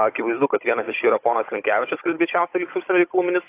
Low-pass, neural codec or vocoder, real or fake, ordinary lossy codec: 3.6 kHz; codec, 16 kHz, 16 kbps, FunCodec, trained on Chinese and English, 50 frames a second; fake; AAC, 24 kbps